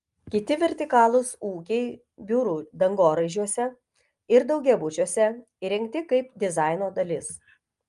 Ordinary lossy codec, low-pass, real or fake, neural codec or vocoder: Opus, 32 kbps; 10.8 kHz; real; none